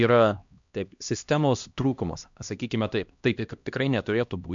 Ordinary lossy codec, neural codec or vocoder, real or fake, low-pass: MP3, 64 kbps; codec, 16 kHz, 1 kbps, X-Codec, HuBERT features, trained on LibriSpeech; fake; 7.2 kHz